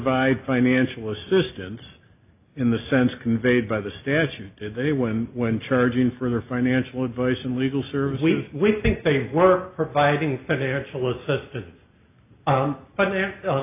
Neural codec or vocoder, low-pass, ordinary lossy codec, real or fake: none; 3.6 kHz; AAC, 32 kbps; real